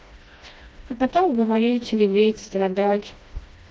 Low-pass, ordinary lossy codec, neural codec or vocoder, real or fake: none; none; codec, 16 kHz, 1 kbps, FreqCodec, smaller model; fake